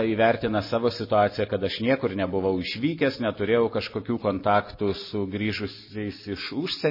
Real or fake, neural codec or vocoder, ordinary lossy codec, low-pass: real; none; MP3, 24 kbps; 5.4 kHz